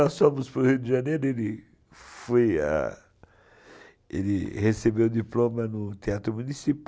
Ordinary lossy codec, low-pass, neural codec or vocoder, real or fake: none; none; none; real